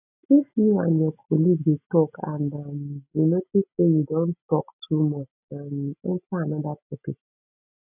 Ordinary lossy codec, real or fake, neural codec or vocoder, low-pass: none; real; none; 3.6 kHz